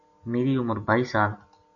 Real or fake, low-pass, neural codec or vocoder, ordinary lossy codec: real; 7.2 kHz; none; MP3, 64 kbps